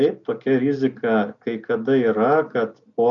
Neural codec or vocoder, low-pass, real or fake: none; 7.2 kHz; real